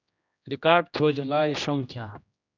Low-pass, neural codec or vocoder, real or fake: 7.2 kHz; codec, 16 kHz, 1 kbps, X-Codec, HuBERT features, trained on general audio; fake